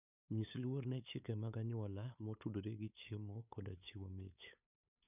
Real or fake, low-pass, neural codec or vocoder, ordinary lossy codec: fake; 3.6 kHz; codec, 16 kHz, 8 kbps, FunCodec, trained on LibriTTS, 25 frames a second; none